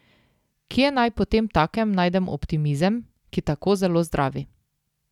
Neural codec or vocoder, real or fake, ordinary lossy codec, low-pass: none; real; none; 19.8 kHz